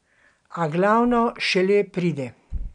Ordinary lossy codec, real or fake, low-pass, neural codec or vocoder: none; real; 9.9 kHz; none